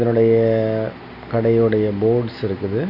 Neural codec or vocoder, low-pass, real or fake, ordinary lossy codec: none; 5.4 kHz; real; none